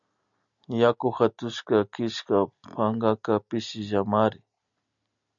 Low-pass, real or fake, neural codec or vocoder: 7.2 kHz; real; none